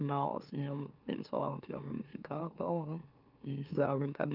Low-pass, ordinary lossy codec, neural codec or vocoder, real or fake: 5.4 kHz; Opus, 24 kbps; autoencoder, 44.1 kHz, a latent of 192 numbers a frame, MeloTTS; fake